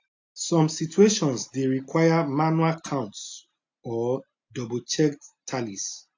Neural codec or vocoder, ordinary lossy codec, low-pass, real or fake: none; AAC, 48 kbps; 7.2 kHz; real